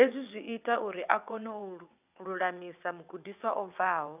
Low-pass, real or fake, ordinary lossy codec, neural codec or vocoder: 3.6 kHz; fake; none; vocoder, 22.05 kHz, 80 mel bands, WaveNeXt